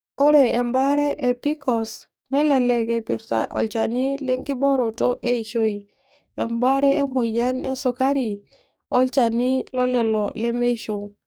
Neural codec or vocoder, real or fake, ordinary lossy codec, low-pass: codec, 44.1 kHz, 2.6 kbps, DAC; fake; none; none